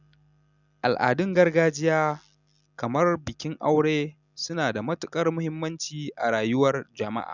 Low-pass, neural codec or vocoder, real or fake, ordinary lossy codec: 7.2 kHz; none; real; none